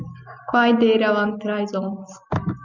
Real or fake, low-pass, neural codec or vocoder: real; 7.2 kHz; none